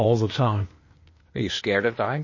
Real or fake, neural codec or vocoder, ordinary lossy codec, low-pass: fake; codec, 16 kHz, 0.8 kbps, ZipCodec; MP3, 32 kbps; 7.2 kHz